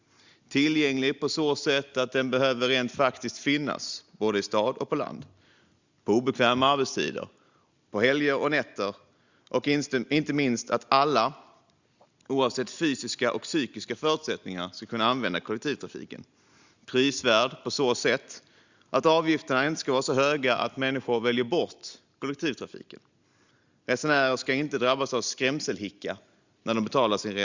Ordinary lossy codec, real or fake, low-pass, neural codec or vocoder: Opus, 64 kbps; real; 7.2 kHz; none